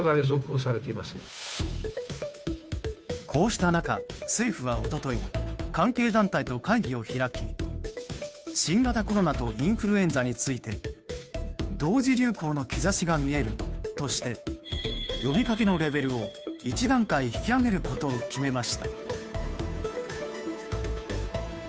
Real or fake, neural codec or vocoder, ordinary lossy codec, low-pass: fake; codec, 16 kHz, 2 kbps, FunCodec, trained on Chinese and English, 25 frames a second; none; none